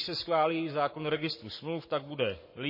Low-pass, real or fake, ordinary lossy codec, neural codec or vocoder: 5.4 kHz; fake; MP3, 24 kbps; codec, 44.1 kHz, 7.8 kbps, Pupu-Codec